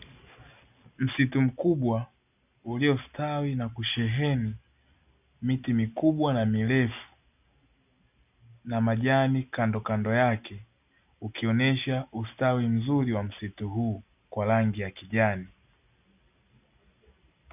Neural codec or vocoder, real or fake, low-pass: none; real; 3.6 kHz